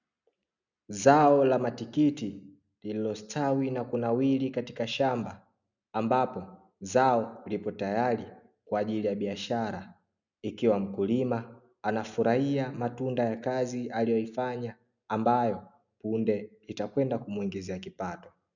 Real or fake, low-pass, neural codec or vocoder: real; 7.2 kHz; none